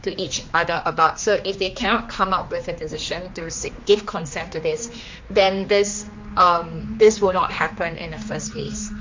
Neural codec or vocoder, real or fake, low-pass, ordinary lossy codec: codec, 16 kHz, 2 kbps, X-Codec, HuBERT features, trained on general audio; fake; 7.2 kHz; MP3, 48 kbps